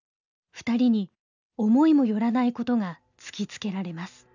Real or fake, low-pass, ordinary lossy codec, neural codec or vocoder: real; 7.2 kHz; none; none